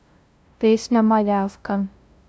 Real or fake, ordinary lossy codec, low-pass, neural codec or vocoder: fake; none; none; codec, 16 kHz, 0.5 kbps, FunCodec, trained on LibriTTS, 25 frames a second